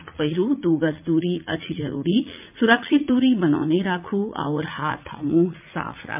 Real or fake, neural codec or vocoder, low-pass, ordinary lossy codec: fake; vocoder, 44.1 kHz, 80 mel bands, Vocos; 3.6 kHz; MP3, 32 kbps